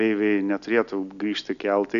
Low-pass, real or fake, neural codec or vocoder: 7.2 kHz; real; none